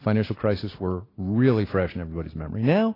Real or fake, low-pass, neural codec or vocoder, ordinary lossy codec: real; 5.4 kHz; none; AAC, 24 kbps